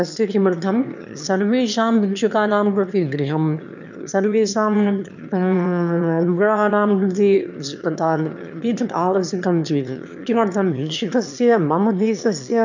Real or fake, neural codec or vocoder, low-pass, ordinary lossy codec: fake; autoencoder, 22.05 kHz, a latent of 192 numbers a frame, VITS, trained on one speaker; 7.2 kHz; none